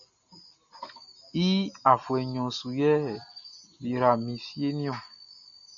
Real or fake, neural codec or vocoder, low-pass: real; none; 7.2 kHz